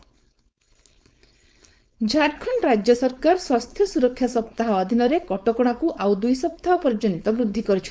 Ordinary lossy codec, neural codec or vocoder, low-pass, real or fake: none; codec, 16 kHz, 4.8 kbps, FACodec; none; fake